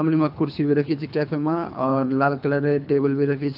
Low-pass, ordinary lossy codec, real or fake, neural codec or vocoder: 5.4 kHz; AAC, 48 kbps; fake; codec, 24 kHz, 3 kbps, HILCodec